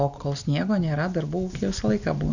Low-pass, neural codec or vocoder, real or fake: 7.2 kHz; none; real